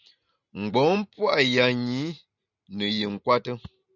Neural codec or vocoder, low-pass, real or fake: none; 7.2 kHz; real